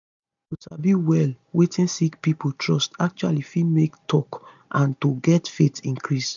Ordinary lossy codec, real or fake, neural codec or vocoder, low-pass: none; real; none; 7.2 kHz